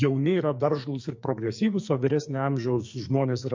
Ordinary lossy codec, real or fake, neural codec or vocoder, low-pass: MP3, 48 kbps; fake; codec, 44.1 kHz, 2.6 kbps, SNAC; 7.2 kHz